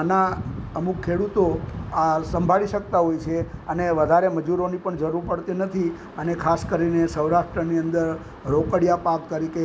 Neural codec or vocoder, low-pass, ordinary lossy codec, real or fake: none; none; none; real